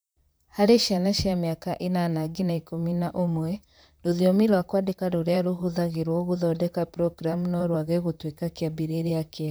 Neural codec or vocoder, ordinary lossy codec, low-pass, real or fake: vocoder, 44.1 kHz, 128 mel bands, Pupu-Vocoder; none; none; fake